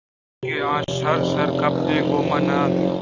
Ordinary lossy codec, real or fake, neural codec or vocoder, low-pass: Opus, 64 kbps; real; none; 7.2 kHz